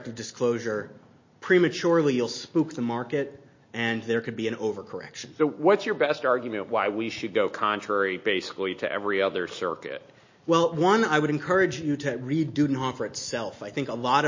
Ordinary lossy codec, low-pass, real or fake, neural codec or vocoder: MP3, 32 kbps; 7.2 kHz; real; none